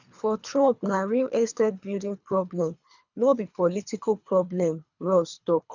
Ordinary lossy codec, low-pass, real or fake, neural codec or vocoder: none; 7.2 kHz; fake; codec, 24 kHz, 3 kbps, HILCodec